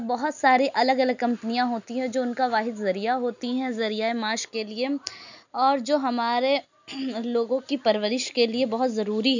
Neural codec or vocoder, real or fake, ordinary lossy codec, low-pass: none; real; none; 7.2 kHz